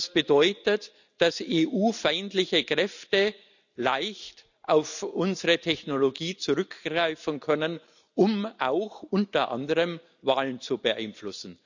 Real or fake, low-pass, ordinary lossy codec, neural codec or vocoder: real; 7.2 kHz; none; none